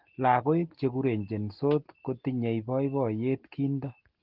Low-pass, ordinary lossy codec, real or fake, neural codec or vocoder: 5.4 kHz; Opus, 16 kbps; real; none